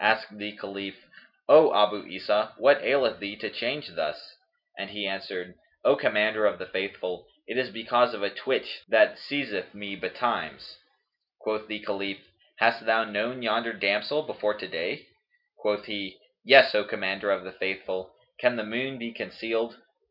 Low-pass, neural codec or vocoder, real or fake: 5.4 kHz; none; real